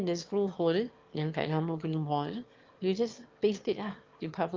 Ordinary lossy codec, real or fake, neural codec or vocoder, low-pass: Opus, 32 kbps; fake; autoencoder, 22.05 kHz, a latent of 192 numbers a frame, VITS, trained on one speaker; 7.2 kHz